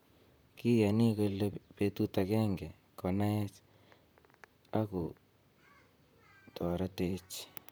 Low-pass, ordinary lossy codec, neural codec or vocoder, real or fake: none; none; vocoder, 44.1 kHz, 128 mel bands, Pupu-Vocoder; fake